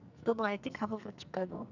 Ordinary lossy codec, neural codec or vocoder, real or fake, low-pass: none; codec, 44.1 kHz, 2.6 kbps, SNAC; fake; 7.2 kHz